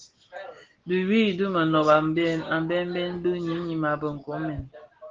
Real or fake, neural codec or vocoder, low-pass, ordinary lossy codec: real; none; 7.2 kHz; Opus, 16 kbps